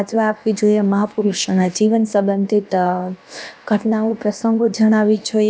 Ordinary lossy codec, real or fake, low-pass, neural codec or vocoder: none; fake; none; codec, 16 kHz, about 1 kbps, DyCAST, with the encoder's durations